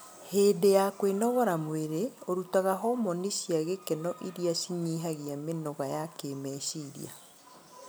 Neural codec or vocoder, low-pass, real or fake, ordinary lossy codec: none; none; real; none